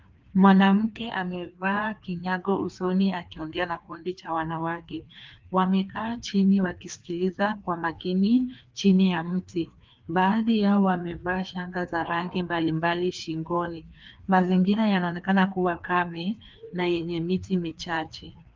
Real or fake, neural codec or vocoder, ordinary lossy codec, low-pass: fake; codec, 16 kHz, 2 kbps, FreqCodec, larger model; Opus, 32 kbps; 7.2 kHz